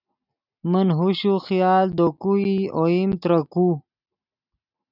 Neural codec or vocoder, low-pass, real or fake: none; 5.4 kHz; real